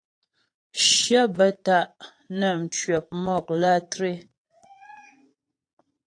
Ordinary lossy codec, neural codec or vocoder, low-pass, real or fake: AAC, 48 kbps; vocoder, 22.05 kHz, 80 mel bands, Vocos; 9.9 kHz; fake